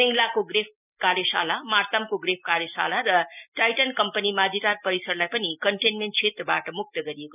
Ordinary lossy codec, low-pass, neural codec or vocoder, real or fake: none; 3.6 kHz; none; real